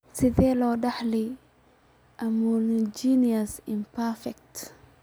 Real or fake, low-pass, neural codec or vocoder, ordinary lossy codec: real; none; none; none